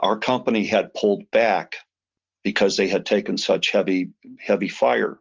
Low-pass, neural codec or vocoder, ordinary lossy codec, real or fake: 7.2 kHz; none; Opus, 32 kbps; real